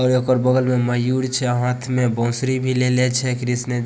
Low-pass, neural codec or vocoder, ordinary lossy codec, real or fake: none; none; none; real